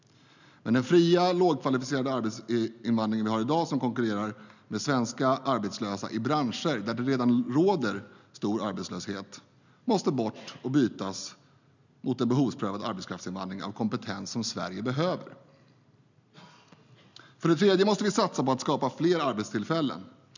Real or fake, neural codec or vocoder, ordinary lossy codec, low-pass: real; none; none; 7.2 kHz